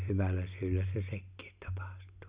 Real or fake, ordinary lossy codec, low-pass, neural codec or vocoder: real; Opus, 64 kbps; 3.6 kHz; none